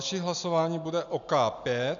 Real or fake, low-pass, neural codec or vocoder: real; 7.2 kHz; none